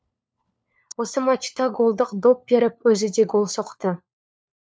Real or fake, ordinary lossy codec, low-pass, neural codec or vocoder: fake; none; none; codec, 16 kHz, 4 kbps, FunCodec, trained on LibriTTS, 50 frames a second